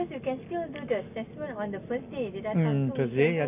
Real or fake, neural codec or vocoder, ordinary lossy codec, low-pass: real; none; none; 3.6 kHz